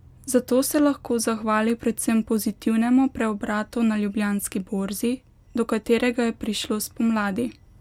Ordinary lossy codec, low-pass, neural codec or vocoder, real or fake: MP3, 96 kbps; 19.8 kHz; none; real